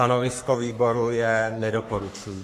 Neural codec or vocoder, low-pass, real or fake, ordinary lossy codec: codec, 44.1 kHz, 3.4 kbps, Pupu-Codec; 14.4 kHz; fake; AAC, 64 kbps